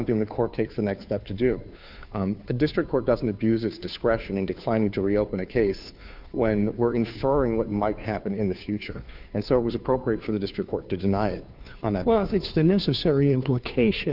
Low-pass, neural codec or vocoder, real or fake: 5.4 kHz; codec, 16 kHz, 2 kbps, FunCodec, trained on Chinese and English, 25 frames a second; fake